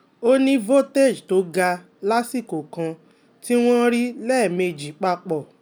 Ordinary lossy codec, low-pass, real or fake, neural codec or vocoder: none; none; real; none